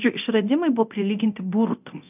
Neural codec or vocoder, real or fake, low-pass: codec, 24 kHz, 0.9 kbps, DualCodec; fake; 3.6 kHz